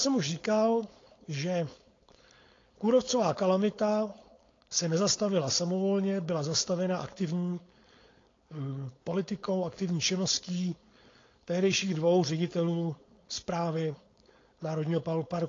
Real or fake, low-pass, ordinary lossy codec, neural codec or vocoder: fake; 7.2 kHz; AAC, 32 kbps; codec, 16 kHz, 4.8 kbps, FACodec